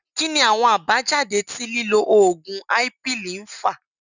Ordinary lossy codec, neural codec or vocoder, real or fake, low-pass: none; none; real; 7.2 kHz